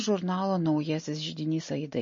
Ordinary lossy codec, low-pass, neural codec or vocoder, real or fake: MP3, 32 kbps; 7.2 kHz; none; real